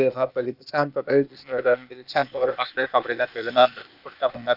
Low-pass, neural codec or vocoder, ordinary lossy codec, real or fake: 5.4 kHz; codec, 16 kHz, 0.8 kbps, ZipCodec; none; fake